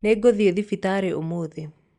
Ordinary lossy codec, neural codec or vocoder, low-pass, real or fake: Opus, 64 kbps; none; 10.8 kHz; real